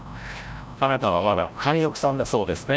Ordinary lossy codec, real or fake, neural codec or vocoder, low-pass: none; fake; codec, 16 kHz, 0.5 kbps, FreqCodec, larger model; none